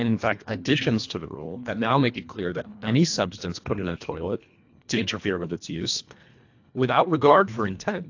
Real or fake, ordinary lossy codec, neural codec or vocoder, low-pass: fake; AAC, 48 kbps; codec, 24 kHz, 1.5 kbps, HILCodec; 7.2 kHz